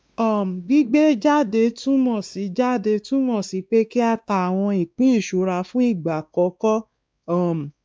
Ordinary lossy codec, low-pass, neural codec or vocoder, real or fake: none; none; codec, 16 kHz, 2 kbps, X-Codec, WavLM features, trained on Multilingual LibriSpeech; fake